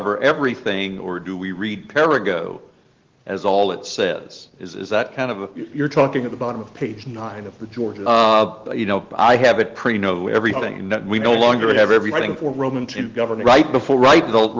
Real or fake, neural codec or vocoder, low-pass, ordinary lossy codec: real; none; 7.2 kHz; Opus, 16 kbps